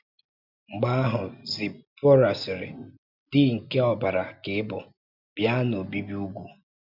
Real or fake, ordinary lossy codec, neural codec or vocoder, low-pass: real; none; none; 5.4 kHz